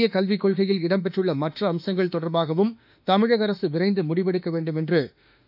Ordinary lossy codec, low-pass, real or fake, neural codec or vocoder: none; 5.4 kHz; fake; autoencoder, 48 kHz, 32 numbers a frame, DAC-VAE, trained on Japanese speech